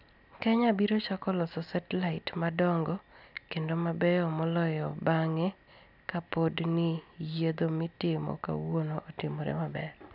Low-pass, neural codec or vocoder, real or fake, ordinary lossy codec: 5.4 kHz; none; real; none